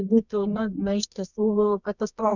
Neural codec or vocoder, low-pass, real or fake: codec, 24 kHz, 0.9 kbps, WavTokenizer, medium music audio release; 7.2 kHz; fake